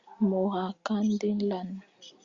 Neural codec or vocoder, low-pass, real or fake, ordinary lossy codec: none; 7.2 kHz; real; Opus, 64 kbps